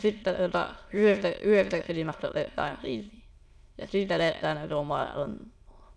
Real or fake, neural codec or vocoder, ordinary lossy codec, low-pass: fake; autoencoder, 22.05 kHz, a latent of 192 numbers a frame, VITS, trained on many speakers; none; none